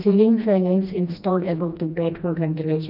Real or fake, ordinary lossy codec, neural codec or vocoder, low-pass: fake; none; codec, 16 kHz, 1 kbps, FreqCodec, smaller model; 5.4 kHz